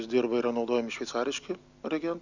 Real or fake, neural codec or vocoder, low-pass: real; none; 7.2 kHz